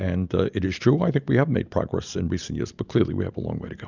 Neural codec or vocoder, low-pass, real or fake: none; 7.2 kHz; real